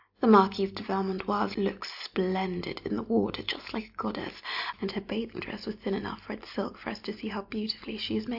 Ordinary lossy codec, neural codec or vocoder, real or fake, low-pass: AAC, 48 kbps; none; real; 5.4 kHz